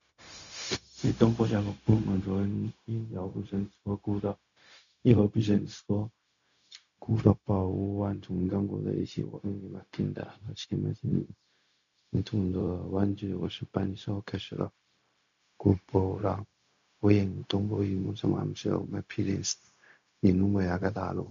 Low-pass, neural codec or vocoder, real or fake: 7.2 kHz; codec, 16 kHz, 0.4 kbps, LongCat-Audio-Codec; fake